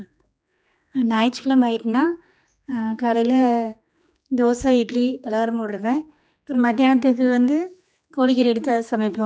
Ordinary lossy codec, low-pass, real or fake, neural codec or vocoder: none; none; fake; codec, 16 kHz, 2 kbps, X-Codec, HuBERT features, trained on general audio